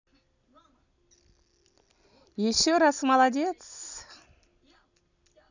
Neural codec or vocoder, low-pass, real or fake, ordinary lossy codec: none; 7.2 kHz; real; none